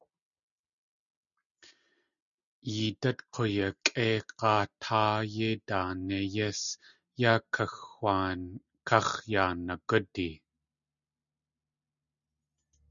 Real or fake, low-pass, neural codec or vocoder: real; 7.2 kHz; none